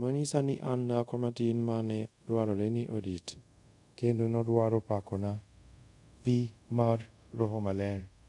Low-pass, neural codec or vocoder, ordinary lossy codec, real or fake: 10.8 kHz; codec, 24 kHz, 0.5 kbps, DualCodec; none; fake